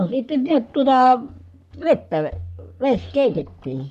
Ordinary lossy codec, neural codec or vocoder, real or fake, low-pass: none; codec, 44.1 kHz, 3.4 kbps, Pupu-Codec; fake; 14.4 kHz